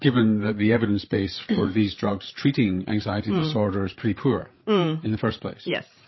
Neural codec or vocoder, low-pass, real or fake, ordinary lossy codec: codec, 16 kHz, 8 kbps, FreqCodec, larger model; 7.2 kHz; fake; MP3, 24 kbps